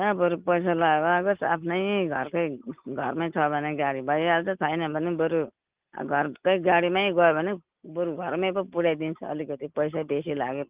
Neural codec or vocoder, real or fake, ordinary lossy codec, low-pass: none; real; Opus, 32 kbps; 3.6 kHz